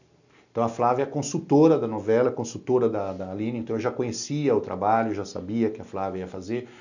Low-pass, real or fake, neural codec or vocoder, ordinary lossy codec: 7.2 kHz; real; none; none